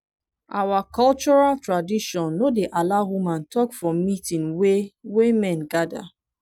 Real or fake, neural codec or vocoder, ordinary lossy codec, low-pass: real; none; none; 19.8 kHz